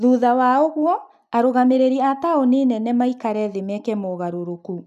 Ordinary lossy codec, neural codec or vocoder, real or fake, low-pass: none; none; real; 14.4 kHz